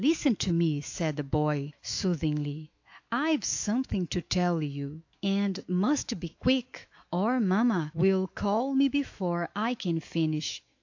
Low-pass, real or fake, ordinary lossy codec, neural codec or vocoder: 7.2 kHz; fake; AAC, 48 kbps; autoencoder, 48 kHz, 128 numbers a frame, DAC-VAE, trained on Japanese speech